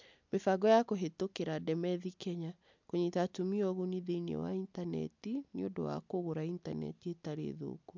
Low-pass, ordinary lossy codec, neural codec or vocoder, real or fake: 7.2 kHz; MP3, 64 kbps; none; real